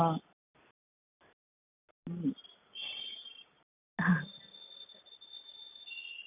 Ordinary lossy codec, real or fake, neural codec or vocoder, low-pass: none; real; none; 3.6 kHz